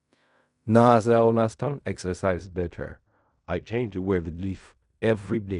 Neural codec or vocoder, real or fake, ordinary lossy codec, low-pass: codec, 16 kHz in and 24 kHz out, 0.4 kbps, LongCat-Audio-Codec, fine tuned four codebook decoder; fake; none; 10.8 kHz